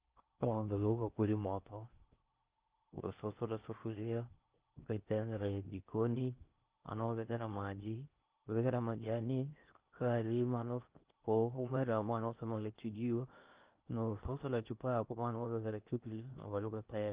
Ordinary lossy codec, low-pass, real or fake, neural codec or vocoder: Opus, 32 kbps; 3.6 kHz; fake; codec, 16 kHz in and 24 kHz out, 0.6 kbps, FocalCodec, streaming, 4096 codes